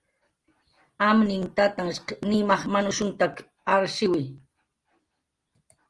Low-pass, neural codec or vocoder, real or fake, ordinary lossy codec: 10.8 kHz; none; real; Opus, 24 kbps